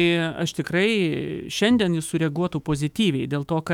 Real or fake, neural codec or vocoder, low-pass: fake; autoencoder, 48 kHz, 128 numbers a frame, DAC-VAE, trained on Japanese speech; 19.8 kHz